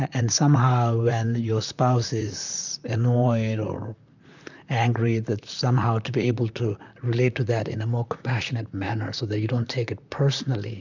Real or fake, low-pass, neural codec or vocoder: fake; 7.2 kHz; vocoder, 44.1 kHz, 128 mel bands, Pupu-Vocoder